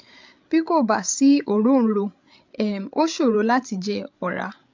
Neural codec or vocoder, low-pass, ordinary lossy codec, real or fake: codec, 16 kHz, 8 kbps, FreqCodec, larger model; 7.2 kHz; MP3, 64 kbps; fake